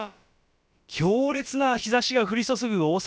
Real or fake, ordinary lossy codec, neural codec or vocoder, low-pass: fake; none; codec, 16 kHz, about 1 kbps, DyCAST, with the encoder's durations; none